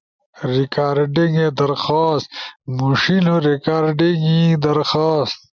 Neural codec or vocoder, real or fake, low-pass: none; real; 7.2 kHz